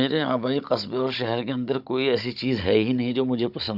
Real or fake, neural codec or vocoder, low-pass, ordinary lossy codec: fake; codec, 16 kHz, 16 kbps, FunCodec, trained on Chinese and English, 50 frames a second; 5.4 kHz; none